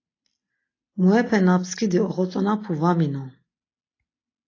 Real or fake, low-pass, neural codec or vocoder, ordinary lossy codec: real; 7.2 kHz; none; AAC, 32 kbps